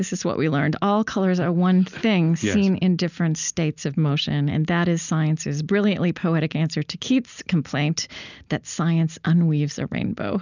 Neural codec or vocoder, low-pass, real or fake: none; 7.2 kHz; real